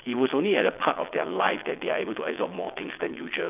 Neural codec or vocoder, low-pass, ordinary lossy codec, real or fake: vocoder, 22.05 kHz, 80 mel bands, WaveNeXt; 3.6 kHz; none; fake